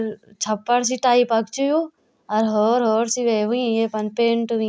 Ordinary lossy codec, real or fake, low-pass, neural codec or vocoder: none; real; none; none